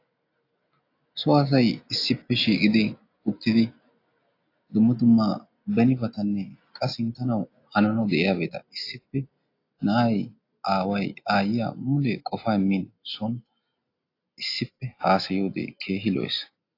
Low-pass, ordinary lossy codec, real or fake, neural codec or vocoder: 5.4 kHz; AAC, 32 kbps; real; none